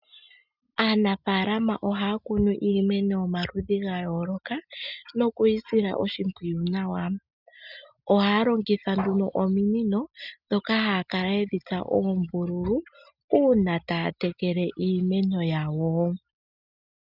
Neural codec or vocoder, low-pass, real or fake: none; 5.4 kHz; real